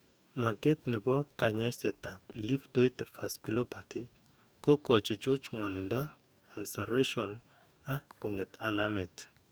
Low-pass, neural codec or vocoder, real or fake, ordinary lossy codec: none; codec, 44.1 kHz, 2.6 kbps, DAC; fake; none